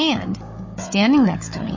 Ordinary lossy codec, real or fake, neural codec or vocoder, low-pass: MP3, 32 kbps; fake; codec, 16 kHz, 16 kbps, FunCodec, trained on Chinese and English, 50 frames a second; 7.2 kHz